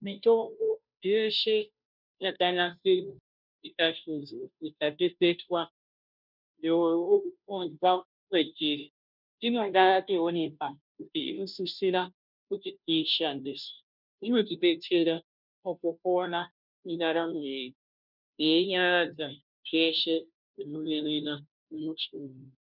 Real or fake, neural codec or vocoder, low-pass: fake; codec, 16 kHz, 0.5 kbps, FunCodec, trained on Chinese and English, 25 frames a second; 5.4 kHz